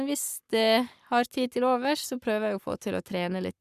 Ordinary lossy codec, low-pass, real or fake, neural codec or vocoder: Opus, 64 kbps; 14.4 kHz; fake; codec, 44.1 kHz, 7.8 kbps, Pupu-Codec